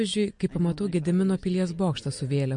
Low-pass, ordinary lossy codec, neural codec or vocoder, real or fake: 9.9 kHz; MP3, 48 kbps; none; real